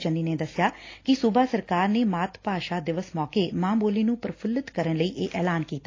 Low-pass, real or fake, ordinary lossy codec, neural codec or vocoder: 7.2 kHz; real; AAC, 32 kbps; none